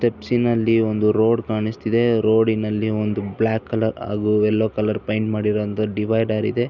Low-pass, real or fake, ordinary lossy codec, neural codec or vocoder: 7.2 kHz; real; none; none